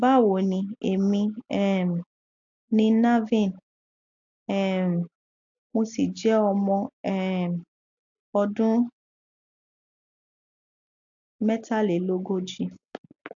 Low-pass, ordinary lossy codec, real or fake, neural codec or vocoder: 7.2 kHz; AAC, 64 kbps; real; none